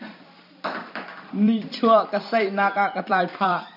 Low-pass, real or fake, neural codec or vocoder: 5.4 kHz; fake; vocoder, 44.1 kHz, 128 mel bands every 256 samples, BigVGAN v2